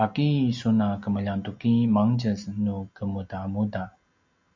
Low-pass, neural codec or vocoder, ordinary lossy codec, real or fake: 7.2 kHz; none; MP3, 64 kbps; real